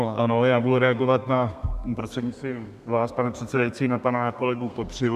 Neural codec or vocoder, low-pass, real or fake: codec, 32 kHz, 1.9 kbps, SNAC; 14.4 kHz; fake